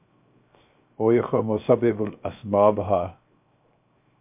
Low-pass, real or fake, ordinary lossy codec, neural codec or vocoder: 3.6 kHz; fake; MP3, 32 kbps; codec, 16 kHz, 0.7 kbps, FocalCodec